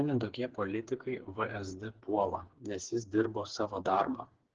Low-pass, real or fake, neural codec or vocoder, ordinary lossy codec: 7.2 kHz; fake; codec, 16 kHz, 4 kbps, FreqCodec, smaller model; Opus, 16 kbps